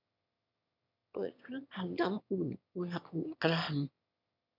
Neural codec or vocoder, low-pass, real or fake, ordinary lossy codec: autoencoder, 22.05 kHz, a latent of 192 numbers a frame, VITS, trained on one speaker; 5.4 kHz; fake; none